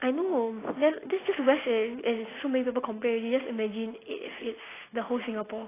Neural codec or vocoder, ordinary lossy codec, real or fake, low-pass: none; AAC, 16 kbps; real; 3.6 kHz